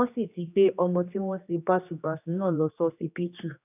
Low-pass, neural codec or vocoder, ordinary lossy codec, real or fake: 3.6 kHz; codec, 16 kHz, 2 kbps, X-Codec, HuBERT features, trained on general audio; none; fake